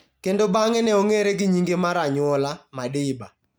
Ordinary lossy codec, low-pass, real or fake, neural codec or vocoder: none; none; real; none